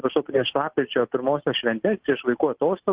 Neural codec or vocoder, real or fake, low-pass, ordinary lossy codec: none; real; 3.6 kHz; Opus, 16 kbps